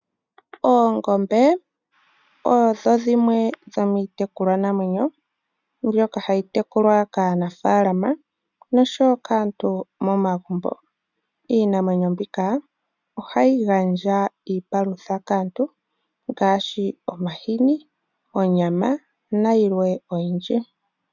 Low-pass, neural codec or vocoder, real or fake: 7.2 kHz; none; real